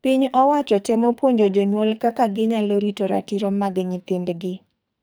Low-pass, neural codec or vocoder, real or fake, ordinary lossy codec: none; codec, 44.1 kHz, 2.6 kbps, SNAC; fake; none